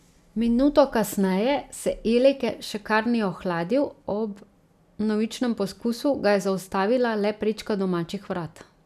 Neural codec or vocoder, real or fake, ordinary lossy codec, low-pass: none; real; none; 14.4 kHz